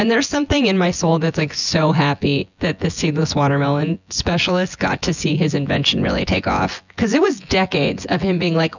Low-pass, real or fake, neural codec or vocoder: 7.2 kHz; fake; vocoder, 24 kHz, 100 mel bands, Vocos